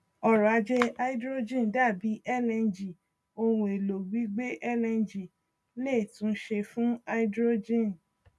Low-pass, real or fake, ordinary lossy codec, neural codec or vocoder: none; real; none; none